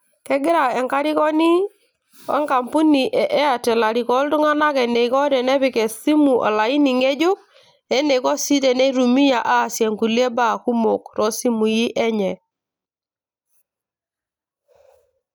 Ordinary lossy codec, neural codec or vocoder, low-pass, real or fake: none; none; none; real